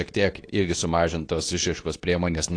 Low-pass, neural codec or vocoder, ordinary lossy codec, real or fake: 9.9 kHz; codec, 24 kHz, 0.9 kbps, WavTokenizer, medium speech release version 2; AAC, 48 kbps; fake